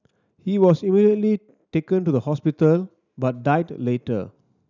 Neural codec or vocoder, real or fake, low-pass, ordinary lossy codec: none; real; 7.2 kHz; none